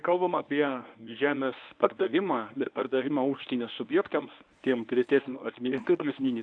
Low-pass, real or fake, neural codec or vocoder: 9.9 kHz; fake; codec, 24 kHz, 0.9 kbps, WavTokenizer, medium speech release version 1